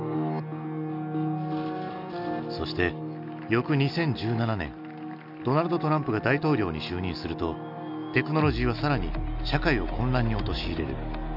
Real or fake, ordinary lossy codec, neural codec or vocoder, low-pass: real; none; none; 5.4 kHz